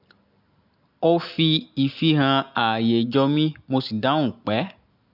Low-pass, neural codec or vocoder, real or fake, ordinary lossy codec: 5.4 kHz; none; real; none